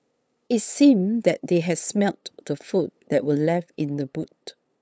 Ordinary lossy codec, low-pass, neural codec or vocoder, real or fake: none; none; codec, 16 kHz, 8 kbps, FunCodec, trained on LibriTTS, 25 frames a second; fake